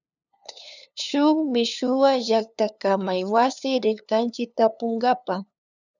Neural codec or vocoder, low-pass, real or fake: codec, 16 kHz, 2 kbps, FunCodec, trained on LibriTTS, 25 frames a second; 7.2 kHz; fake